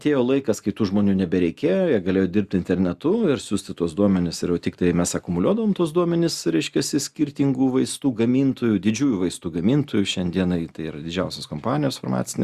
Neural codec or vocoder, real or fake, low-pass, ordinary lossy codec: none; real; 14.4 kHz; Opus, 64 kbps